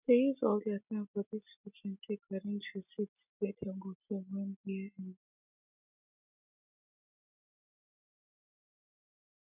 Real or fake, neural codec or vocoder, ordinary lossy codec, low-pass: real; none; none; 3.6 kHz